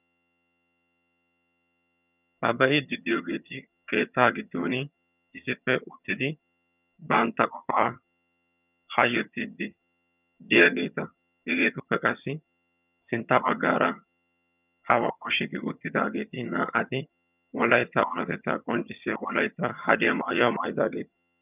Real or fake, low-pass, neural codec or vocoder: fake; 3.6 kHz; vocoder, 22.05 kHz, 80 mel bands, HiFi-GAN